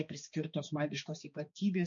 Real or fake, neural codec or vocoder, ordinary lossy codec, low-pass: fake; codec, 16 kHz, 2 kbps, X-Codec, HuBERT features, trained on balanced general audio; MP3, 48 kbps; 7.2 kHz